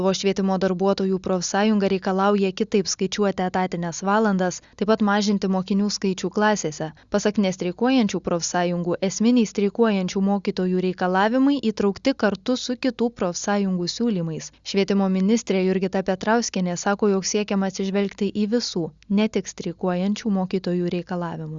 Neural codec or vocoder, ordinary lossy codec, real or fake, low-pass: none; Opus, 64 kbps; real; 7.2 kHz